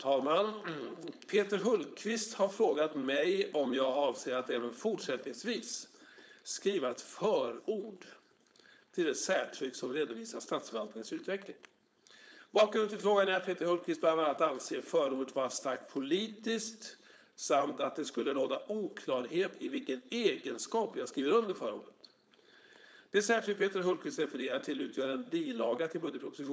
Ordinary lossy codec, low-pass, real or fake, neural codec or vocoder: none; none; fake; codec, 16 kHz, 4.8 kbps, FACodec